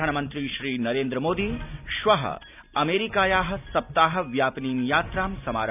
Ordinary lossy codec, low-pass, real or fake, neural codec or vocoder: none; 3.6 kHz; real; none